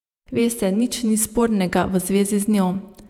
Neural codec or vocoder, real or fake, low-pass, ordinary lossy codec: vocoder, 48 kHz, 128 mel bands, Vocos; fake; 19.8 kHz; none